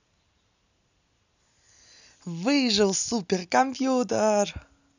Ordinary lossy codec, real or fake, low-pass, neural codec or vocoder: none; real; 7.2 kHz; none